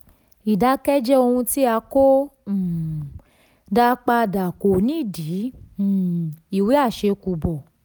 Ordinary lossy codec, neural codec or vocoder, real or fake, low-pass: none; none; real; none